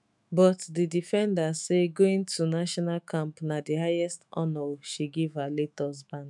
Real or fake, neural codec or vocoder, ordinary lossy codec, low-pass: fake; autoencoder, 48 kHz, 128 numbers a frame, DAC-VAE, trained on Japanese speech; none; 10.8 kHz